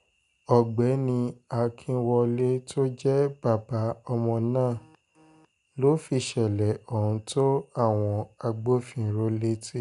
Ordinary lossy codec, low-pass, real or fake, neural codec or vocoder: none; 10.8 kHz; real; none